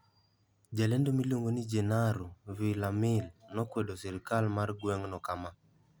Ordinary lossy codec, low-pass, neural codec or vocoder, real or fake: none; none; none; real